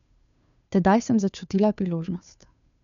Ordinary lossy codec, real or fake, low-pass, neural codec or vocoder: none; fake; 7.2 kHz; codec, 16 kHz, 2 kbps, FunCodec, trained on Chinese and English, 25 frames a second